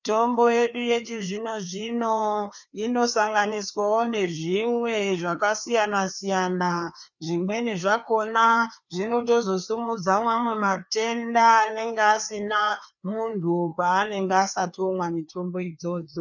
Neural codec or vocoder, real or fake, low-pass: codec, 16 kHz, 2 kbps, FreqCodec, larger model; fake; 7.2 kHz